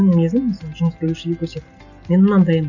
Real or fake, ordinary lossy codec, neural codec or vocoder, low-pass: real; none; none; 7.2 kHz